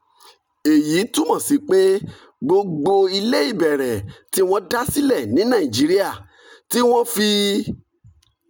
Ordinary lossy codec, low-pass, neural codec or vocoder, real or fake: none; none; none; real